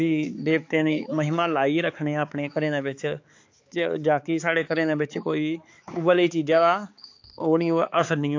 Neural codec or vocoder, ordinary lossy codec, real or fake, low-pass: codec, 16 kHz, 4 kbps, X-Codec, HuBERT features, trained on balanced general audio; AAC, 48 kbps; fake; 7.2 kHz